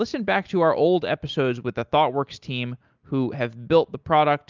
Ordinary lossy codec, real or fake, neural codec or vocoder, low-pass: Opus, 24 kbps; real; none; 7.2 kHz